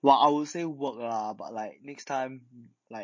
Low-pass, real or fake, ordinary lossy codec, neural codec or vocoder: 7.2 kHz; real; MP3, 32 kbps; none